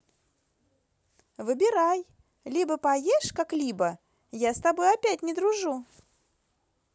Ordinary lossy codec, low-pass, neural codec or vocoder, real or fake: none; none; none; real